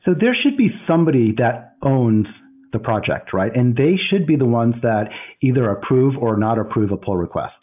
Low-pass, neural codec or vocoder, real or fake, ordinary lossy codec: 3.6 kHz; none; real; AAC, 32 kbps